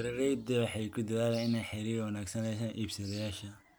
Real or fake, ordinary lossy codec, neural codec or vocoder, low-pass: real; none; none; none